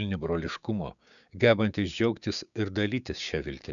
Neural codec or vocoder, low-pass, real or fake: codec, 16 kHz, 6 kbps, DAC; 7.2 kHz; fake